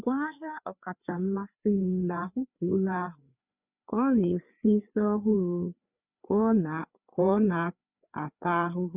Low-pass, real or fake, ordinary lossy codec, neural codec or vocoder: 3.6 kHz; fake; none; codec, 16 kHz, 4 kbps, FreqCodec, larger model